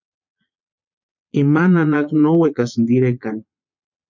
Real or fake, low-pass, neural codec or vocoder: fake; 7.2 kHz; vocoder, 22.05 kHz, 80 mel bands, Vocos